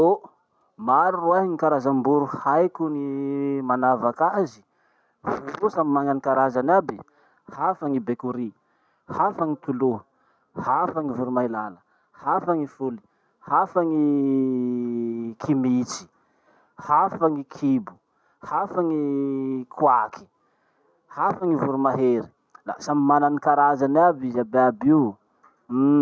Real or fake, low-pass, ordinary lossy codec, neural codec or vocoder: real; none; none; none